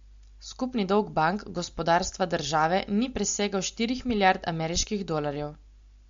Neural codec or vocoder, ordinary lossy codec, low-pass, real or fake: none; MP3, 48 kbps; 7.2 kHz; real